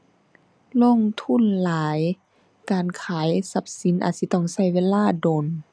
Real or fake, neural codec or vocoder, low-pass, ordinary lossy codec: real; none; none; none